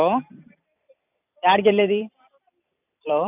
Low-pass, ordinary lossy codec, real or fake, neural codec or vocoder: 3.6 kHz; none; fake; vocoder, 44.1 kHz, 128 mel bands every 256 samples, BigVGAN v2